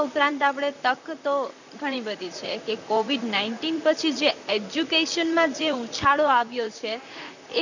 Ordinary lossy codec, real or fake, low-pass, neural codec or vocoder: none; fake; 7.2 kHz; vocoder, 44.1 kHz, 128 mel bands, Pupu-Vocoder